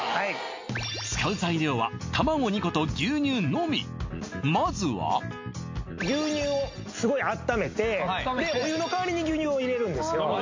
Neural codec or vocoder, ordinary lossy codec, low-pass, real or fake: none; MP3, 48 kbps; 7.2 kHz; real